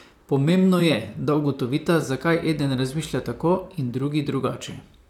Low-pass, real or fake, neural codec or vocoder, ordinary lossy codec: 19.8 kHz; fake; vocoder, 44.1 kHz, 128 mel bands, Pupu-Vocoder; none